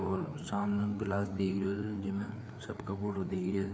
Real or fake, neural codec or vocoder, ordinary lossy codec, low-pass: fake; codec, 16 kHz, 4 kbps, FreqCodec, larger model; none; none